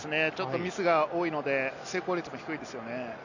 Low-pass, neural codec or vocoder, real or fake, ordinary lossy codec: 7.2 kHz; none; real; none